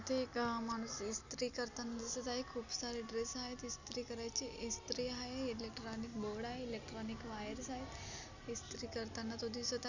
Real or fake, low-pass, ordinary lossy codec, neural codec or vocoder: real; 7.2 kHz; none; none